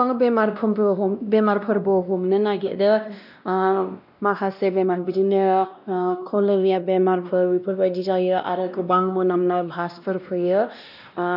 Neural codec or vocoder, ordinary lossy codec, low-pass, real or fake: codec, 16 kHz, 1 kbps, X-Codec, WavLM features, trained on Multilingual LibriSpeech; none; 5.4 kHz; fake